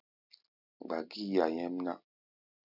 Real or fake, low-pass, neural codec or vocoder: real; 5.4 kHz; none